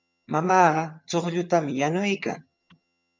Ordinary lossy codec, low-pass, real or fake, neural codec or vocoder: MP3, 64 kbps; 7.2 kHz; fake; vocoder, 22.05 kHz, 80 mel bands, HiFi-GAN